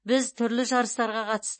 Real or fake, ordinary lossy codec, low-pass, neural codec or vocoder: real; MP3, 32 kbps; 9.9 kHz; none